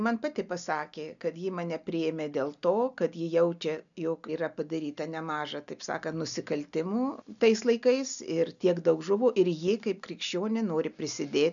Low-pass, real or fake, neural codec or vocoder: 7.2 kHz; real; none